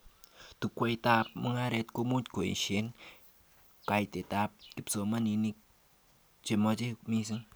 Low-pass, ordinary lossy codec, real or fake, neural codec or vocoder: none; none; real; none